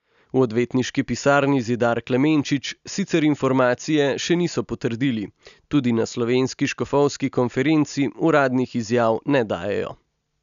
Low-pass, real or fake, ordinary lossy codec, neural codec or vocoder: 7.2 kHz; real; none; none